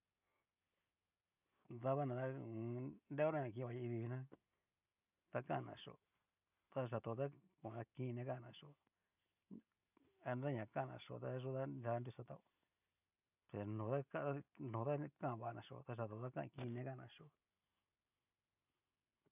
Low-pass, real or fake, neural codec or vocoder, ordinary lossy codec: 3.6 kHz; real; none; none